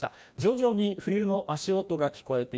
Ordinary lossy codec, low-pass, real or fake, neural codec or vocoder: none; none; fake; codec, 16 kHz, 1 kbps, FreqCodec, larger model